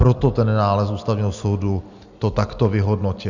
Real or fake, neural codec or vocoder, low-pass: real; none; 7.2 kHz